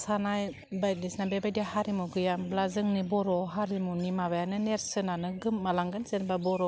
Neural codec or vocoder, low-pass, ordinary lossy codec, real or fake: none; none; none; real